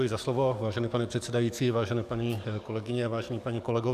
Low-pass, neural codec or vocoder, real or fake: 14.4 kHz; codec, 44.1 kHz, 7.8 kbps, DAC; fake